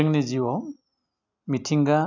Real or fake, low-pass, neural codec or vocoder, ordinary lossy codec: real; 7.2 kHz; none; none